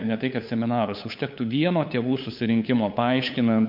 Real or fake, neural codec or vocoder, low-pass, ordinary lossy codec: fake; codec, 16 kHz, 8 kbps, FunCodec, trained on LibriTTS, 25 frames a second; 5.4 kHz; MP3, 48 kbps